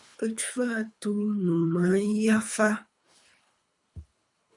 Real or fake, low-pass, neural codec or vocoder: fake; 10.8 kHz; codec, 24 kHz, 3 kbps, HILCodec